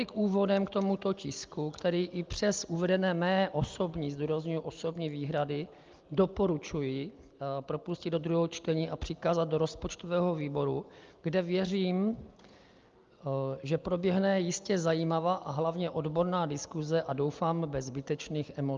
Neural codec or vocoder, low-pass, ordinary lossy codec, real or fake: none; 7.2 kHz; Opus, 32 kbps; real